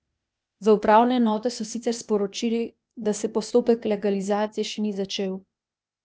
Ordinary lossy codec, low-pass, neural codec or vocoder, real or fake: none; none; codec, 16 kHz, 0.8 kbps, ZipCodec; fake